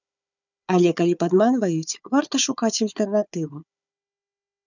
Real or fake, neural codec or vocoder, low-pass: fake; codec, 16 kHz, 4 kbps, FunCodec, trained on Chinese and English, 50 frames a second; 7.2 kHz